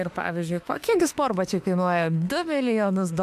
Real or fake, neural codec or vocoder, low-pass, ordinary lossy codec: fake; autoencoder, 48 kHz, 32 numbers a frame, DAC-VAE, trained on Japanese speech; 14.4 kHz; AAC, 96 kbps